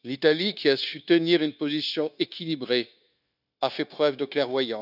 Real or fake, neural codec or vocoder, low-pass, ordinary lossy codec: fake; codec, 16 kHz, 0.9 kbps, LongCat-Audio-Codec; 5.4 kHz; none